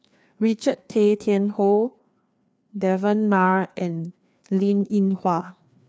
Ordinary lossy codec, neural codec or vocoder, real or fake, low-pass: none; codec, 16 kHz, 2 kbps, FreqCodec, larger model; fake; none